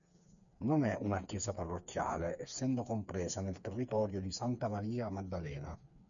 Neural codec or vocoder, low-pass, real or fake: codec, 16 kHz, 4 kbps, FreqCodec, smaller model; 7.2 kHz; fake